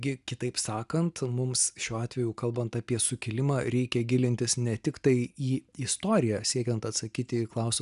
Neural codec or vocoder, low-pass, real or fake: none; 10.8 kHz; real